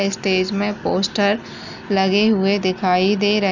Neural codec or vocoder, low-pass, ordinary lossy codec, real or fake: none; 7.2 kHz; none; real